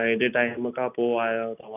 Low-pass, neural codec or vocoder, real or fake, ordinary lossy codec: 3.6 kHz; none; real; none